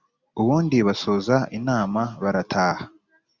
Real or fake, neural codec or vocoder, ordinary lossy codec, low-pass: real; none; Opus, 64 kbps; 7.2 kHz